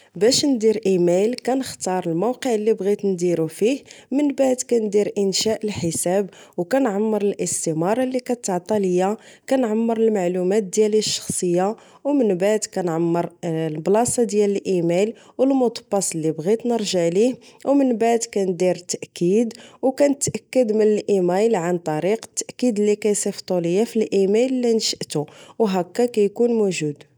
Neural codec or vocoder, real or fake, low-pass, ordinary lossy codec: none; real; none; none